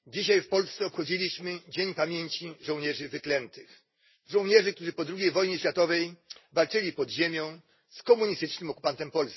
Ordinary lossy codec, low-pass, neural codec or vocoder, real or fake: MP3, 24 kbps; 7.2 kHz; none; real